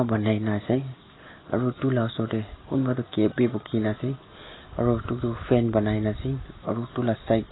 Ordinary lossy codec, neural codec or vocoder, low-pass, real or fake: AAC, 16 kbps; none; 7.2 kHz; real